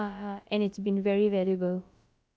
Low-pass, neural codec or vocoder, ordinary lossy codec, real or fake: none; codec, 16 kHz, about 1 kbps, DyCAST, with the encoder's durations; none; fake